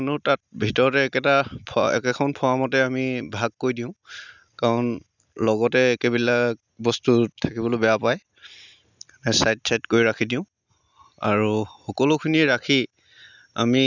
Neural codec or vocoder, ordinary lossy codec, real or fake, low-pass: none; none; real; 7.2 kHz